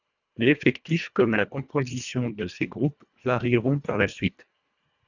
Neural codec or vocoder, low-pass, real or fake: codec, 24 kHz, 1.5 kbps, HILCodec; 7.2 kHz; fake